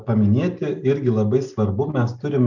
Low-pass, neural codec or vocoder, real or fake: 7.2 kHz; none; real